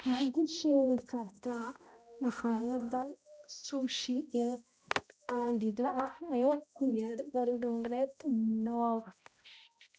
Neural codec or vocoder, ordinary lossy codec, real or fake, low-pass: codec, 16 kHz, 0.5 kbps, X-Codec, HuBERT features, trained on balanced general audio; none; fake; none